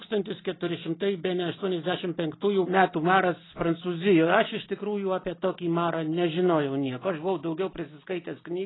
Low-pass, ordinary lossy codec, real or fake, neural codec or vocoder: 7.2 kHz; AAC, 16 kbps; real; none